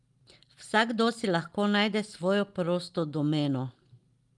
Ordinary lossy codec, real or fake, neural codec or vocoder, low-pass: Opus, 32 kbps; real; none; 10.8 kHz